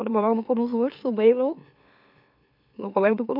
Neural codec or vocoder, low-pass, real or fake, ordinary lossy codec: autoencoder, 44.1 kHz, a latent of 192 numbers a frame, MeloTTS; 5.4 kHz; fake; none